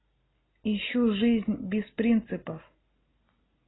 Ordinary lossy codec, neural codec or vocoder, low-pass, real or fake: AAC, 16 kbps; none; 7.2 kHz; real